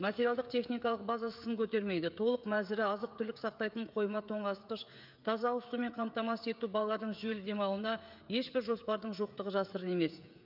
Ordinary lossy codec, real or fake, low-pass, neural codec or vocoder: none; fake; 5.4 kHz; codec, 16 kHz, 16 kbps, FreqCodec, smaller model